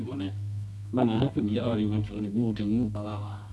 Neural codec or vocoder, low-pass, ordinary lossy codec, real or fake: codec, 24 kHz, 0.9 kbps, WavTokenizer, medium music audio release; none; none; fake